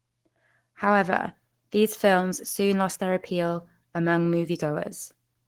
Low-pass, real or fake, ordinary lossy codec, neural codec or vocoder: 19.8 kHz; fake; Opus, 16 kbps; codec, 44.1 kHz, 7.8 kbps, Pupu-Codec